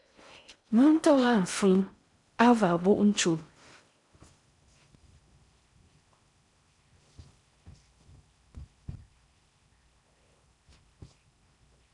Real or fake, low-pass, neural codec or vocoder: fake; 10.8 kHz; codec, 16 kHz in and 24 kHz out, 0.6 kbps, FocalCodec, streaming, 4096 codes